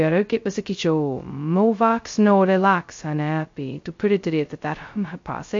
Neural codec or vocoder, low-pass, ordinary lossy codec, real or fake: codec, 16 kHz, 0.2 kbps, FocalCodec; 7.2 kHz; MP3, 48 kbps; fake